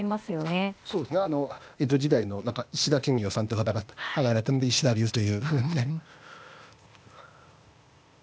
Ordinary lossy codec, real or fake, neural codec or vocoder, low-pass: none; fake; codec, 16 kHz, 0.8 kbps, ZipCodec; none